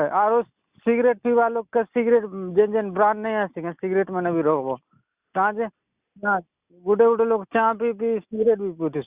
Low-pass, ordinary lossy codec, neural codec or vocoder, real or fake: 3.6 kHz; Opus, 64 kbps; none; real